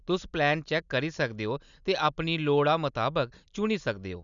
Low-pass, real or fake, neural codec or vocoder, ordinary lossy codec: 7.2 kHz; real; none; none